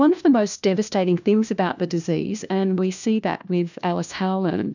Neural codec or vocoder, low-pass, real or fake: codec, 16 kHz, 1 kbps, FunCodec, trained on LibriTTS, 50 frames a second; 7.2 kHz; fake